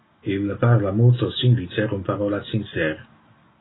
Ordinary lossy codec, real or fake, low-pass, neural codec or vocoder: AAC, 16 kbps; real; 7.2 kHz; none